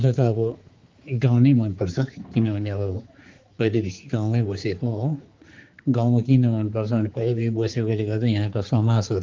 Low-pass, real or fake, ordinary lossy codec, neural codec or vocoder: 7.2 kHz; fake; Opus, 32 kbps; codec, 16 kHz, 4 kbps, X-Codec, HuBERT features, trained on general audio